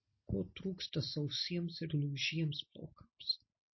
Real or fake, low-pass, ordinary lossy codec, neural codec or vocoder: real; 7.2 kHz; MP3, 24 kbps; none